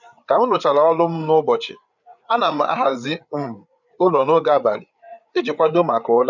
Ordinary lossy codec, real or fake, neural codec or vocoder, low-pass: none; fake; codec, 16 kHz, 8 kbps, FreqCodec, larger model; 7.2 kHz